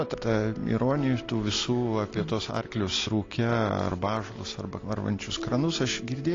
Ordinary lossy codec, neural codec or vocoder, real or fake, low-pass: AAC, 32 kbps; none; real; 7.2 kHz